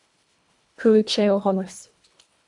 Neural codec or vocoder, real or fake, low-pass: codec, 24 kHz, 1.5 kbps, HILCodec; fake; 10.8 kHz